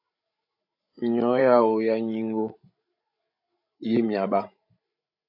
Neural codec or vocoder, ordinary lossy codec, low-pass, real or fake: codec, 16 kHz, 16 kbps, FreqCodec, larger model; AAC, 48 kbps; 5.4 kHz; fake